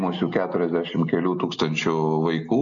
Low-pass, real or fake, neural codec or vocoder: 7.2 kHz; real; none